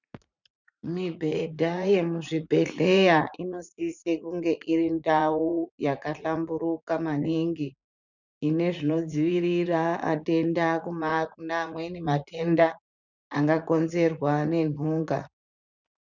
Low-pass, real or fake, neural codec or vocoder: 7.2 kHz; fake; vocoder, 44.1 kHz, 80 mel bands, Vocos